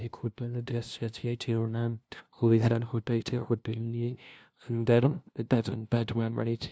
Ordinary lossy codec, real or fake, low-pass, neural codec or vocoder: none; fake; none; codec, 16 kHz, 0.5 kbps, FunCodec, trained on LibriTTS, 25 frames a second